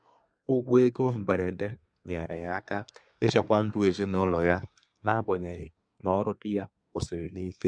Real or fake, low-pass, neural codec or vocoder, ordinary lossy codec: fake; 9.9 kHz; codec, 24 kHz, 1 kbps, SNAC; none